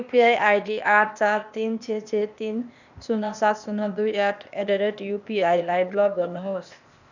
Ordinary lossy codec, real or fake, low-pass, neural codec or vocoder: none; fake; 7.2 kHz; codec, 16 kHz, 0.8 kbps, ZipCodec